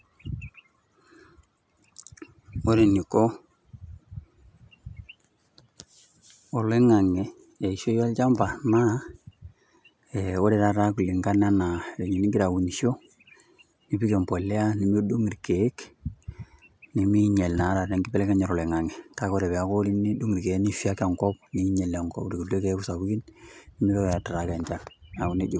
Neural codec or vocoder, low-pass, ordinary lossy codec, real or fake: none; none; none; real